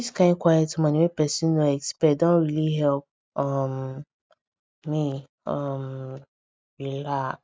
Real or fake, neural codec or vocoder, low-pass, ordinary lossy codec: real; none; none; none